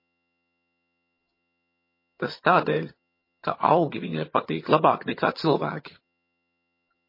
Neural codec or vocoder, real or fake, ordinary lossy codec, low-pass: vocoder, 22.05 kHz, 80 mel bands, HiFi-GAN; fake; MP3, 24 kbps; 5.4 kHz